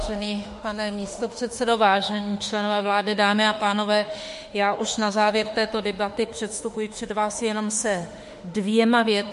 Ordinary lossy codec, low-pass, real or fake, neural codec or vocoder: MP3, 48 kbps; 14.4 kHz; fake; autoencoder, 48 kHz, 32 numbers a frame, DAC-VAE, trained on Japanese speech